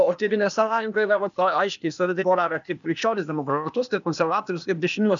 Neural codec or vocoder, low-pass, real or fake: codec, 16 kHz, 0.8 kbps, ZipCodec; 7.2 kHz; fake